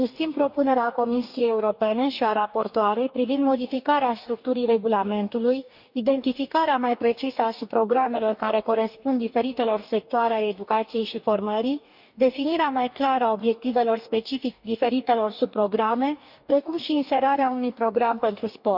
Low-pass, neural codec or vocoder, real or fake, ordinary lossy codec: 5.4 kHz; codec, 44.1 kHz, 2.6 kbps, DAC; fake; none